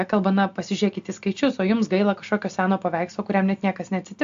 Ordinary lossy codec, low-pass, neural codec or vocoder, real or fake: AAC, 64 kbps; 7.2 kHz; none; real